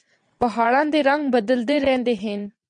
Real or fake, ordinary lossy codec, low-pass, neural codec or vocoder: fake; MP3, 64 kbps; 9.9 kHz; vocoder, 22.05 kHz, 80 mel bands, WaveNeXt